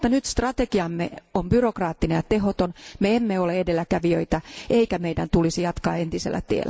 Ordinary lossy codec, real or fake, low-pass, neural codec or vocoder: none; real; none; none